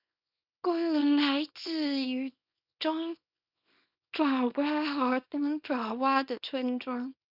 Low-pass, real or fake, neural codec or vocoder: 5.4 kHz; fake; codec, 24 kHz, 0.9 kbps, WavTokenizer, small release